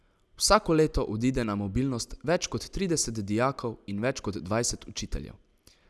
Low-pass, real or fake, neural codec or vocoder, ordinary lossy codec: none; real; none; none